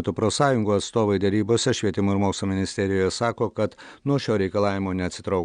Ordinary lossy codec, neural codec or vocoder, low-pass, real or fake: Opus, 64 kbps; none; 9.9 kHz; real